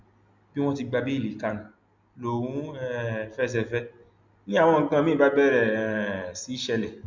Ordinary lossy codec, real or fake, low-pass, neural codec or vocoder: MP3, 64 kbps; real; 7.2 kHz; none